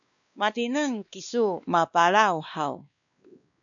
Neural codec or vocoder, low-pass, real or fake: codec, 16 kHz, 2 kbps, X-Codec, WavLM features, trained on Multilingual LibriSpeech; 7.2 kHz; fake